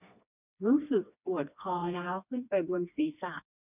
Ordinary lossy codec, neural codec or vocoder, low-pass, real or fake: none; codec, 16 kHz, 2 kbps, FreqCodec, smaller model; 3.6 kHz; fake